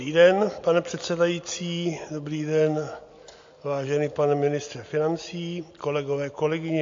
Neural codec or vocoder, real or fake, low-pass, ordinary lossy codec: none; real; 7.2 kHz; AAC, 48 kbps